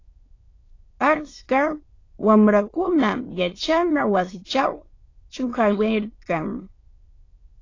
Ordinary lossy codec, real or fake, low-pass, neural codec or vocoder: AAC, 32 kbps; fake; 7.2 kHz; autoencoder, 22.05 kHz, a latent of 192 numbers a frame, VITS, trained on many speakers